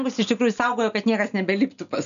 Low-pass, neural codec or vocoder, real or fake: 7.2 kHz; none; real